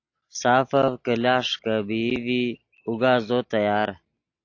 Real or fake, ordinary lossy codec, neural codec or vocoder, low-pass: real; AAC, 48 kbps; none; 7.2 kHz